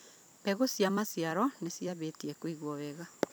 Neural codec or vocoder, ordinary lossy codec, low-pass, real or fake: vocoder, 44.1 kHz, 128 mel bands every 256 samples, BigVGAN v2; none; none; fake